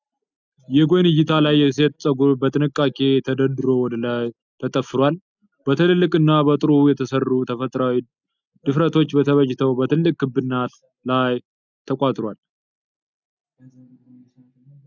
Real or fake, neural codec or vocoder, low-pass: real; none; 7.2 kHz